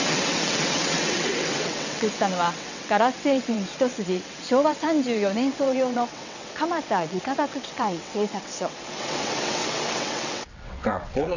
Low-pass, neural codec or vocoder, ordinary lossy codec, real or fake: 7.2 kHz; vocoder, 22.05 kHz, 80 mel bands, WaveNeXt; none; fake